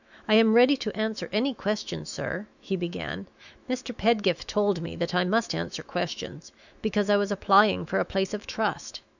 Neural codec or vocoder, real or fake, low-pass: autoencoder, 48 kHz, 128 numbers a frame, DAC-VAE, trained on Japanese speech; fake; 7.2 kHz